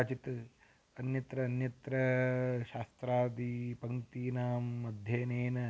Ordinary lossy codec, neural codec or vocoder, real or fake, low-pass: none; none; real; none